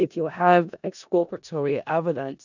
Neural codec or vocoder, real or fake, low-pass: codec, 16 kHz in and 24 kHz out, 0.4 kbps, LongCat-Audio-Codec, four codebook decoder; fake; 7.2 kHz